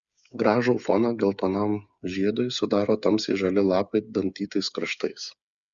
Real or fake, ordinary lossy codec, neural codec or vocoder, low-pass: fake; Opus, 64 kbps; codec, 16 kHz, 8 kbps, FreqCodec, smaller model; 7.2 kHz